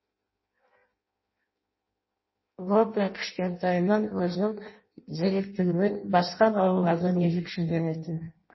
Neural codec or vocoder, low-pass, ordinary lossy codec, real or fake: codec, 16 kHz in and 24 kHz out, 0.6 kbps, FireRedTTS-2 codec; 7.2 kHz; MP3, 24 kbps; fake